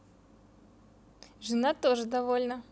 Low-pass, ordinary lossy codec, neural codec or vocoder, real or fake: none; none; none; real